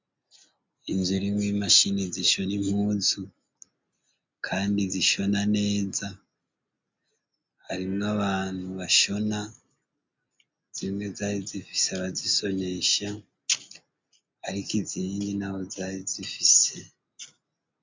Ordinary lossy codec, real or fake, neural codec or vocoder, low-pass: MP3, 64 kbps; real; none; 7.2 kHz